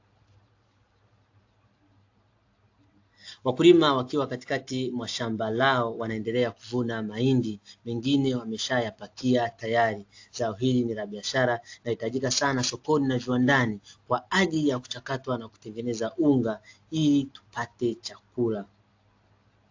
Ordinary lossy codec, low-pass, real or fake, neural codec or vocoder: AAC, 48 kbps; 7.2 kHz; real; none